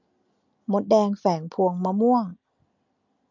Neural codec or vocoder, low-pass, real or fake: none; 7.2 kHz; real